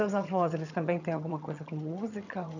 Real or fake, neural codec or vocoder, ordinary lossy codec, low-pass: fake; vocoder, 22.05 kHz, 80 mel bands, HiFi-GAN; none; 7.2 kHz